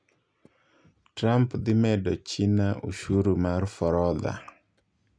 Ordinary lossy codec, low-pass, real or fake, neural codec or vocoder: none; 9.9 kHz; real; none